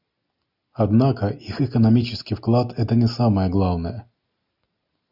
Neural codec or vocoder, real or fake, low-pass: none; real; 5.4 kHz